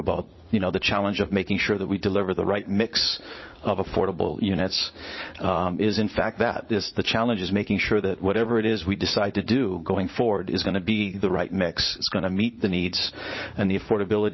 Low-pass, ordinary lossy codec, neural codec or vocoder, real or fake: 7.2 kHz; MP3, 24 kbps; vocoder, 44.1 kHz, 80 mel bands, Vocos; fake